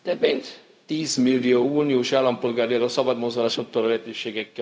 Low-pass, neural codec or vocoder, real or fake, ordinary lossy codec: none; codec, 16 kHz, 0.4 kbps, LongCat-Audio-Codec; fake; none